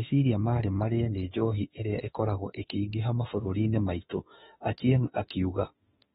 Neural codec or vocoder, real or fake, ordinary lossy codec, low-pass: autoencoder, 48 kHz, 32 numbers a frame, DAC-VAE, trained on Japanese speech; fake; AAC, 16 kbps; 19.8 kHz